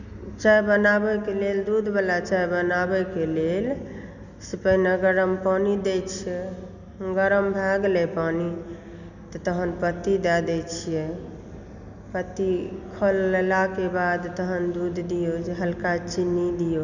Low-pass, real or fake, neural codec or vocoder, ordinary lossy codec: 7.2 kHz; real; none; none